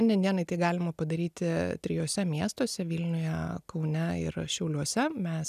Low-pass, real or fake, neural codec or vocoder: 14.4 kHz; real; none